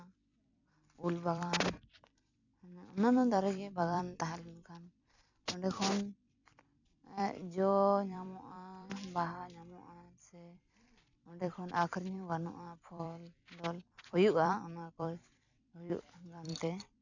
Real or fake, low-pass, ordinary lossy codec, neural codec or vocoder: fake; 7.2 kHz; none; vocoder, 44.1 kHz, 128 mel bands every 256 samples, BigVGAN v2